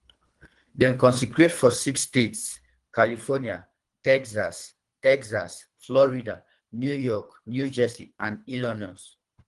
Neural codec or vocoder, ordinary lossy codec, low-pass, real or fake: codec, 24 kHz, 3 kbps, HILCodec; Opus, 24 kbps; 10.8 kHz; fake